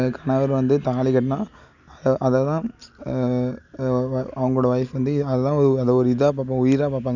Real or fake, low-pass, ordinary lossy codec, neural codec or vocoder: real; 7.2 kHz; none; none